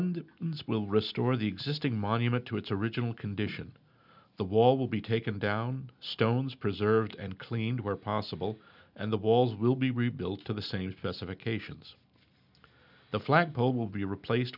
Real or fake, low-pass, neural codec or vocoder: real; 5.4 kHz; none